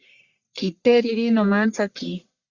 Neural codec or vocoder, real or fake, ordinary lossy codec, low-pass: codec, 44.1 kHz, 1.7 kbps, Pupu-Codec; fake; Opus, 64 kbps; 7.2 kHz